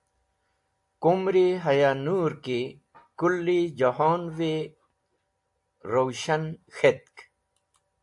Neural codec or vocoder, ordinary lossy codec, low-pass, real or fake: none; AAC, 64 kbps; 10.8 kHz; real